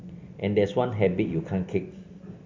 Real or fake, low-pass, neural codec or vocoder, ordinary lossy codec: real; 7.2 kHz; none; MP3, 48 kbps